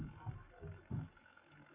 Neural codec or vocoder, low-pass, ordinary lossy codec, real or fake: codec, 44.1 kHz, 7.8 kbps, Pupu-Codec; 3.6 kHz; AAC, 24 kbps; fake